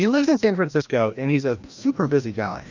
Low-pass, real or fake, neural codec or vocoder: 7.2 kHz; fake; codec, 16 kHz, 1 kbps, FreqCodec, larger model